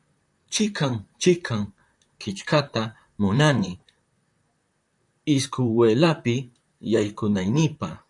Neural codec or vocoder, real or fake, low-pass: vocoder, 44.1 kHz, 128 mel bands, Pupu-Vocoder; fake; 10.8 kHz